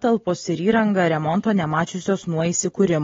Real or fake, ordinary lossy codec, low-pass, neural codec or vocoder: real; AAC, 24 kbps; 7.2 kHz; none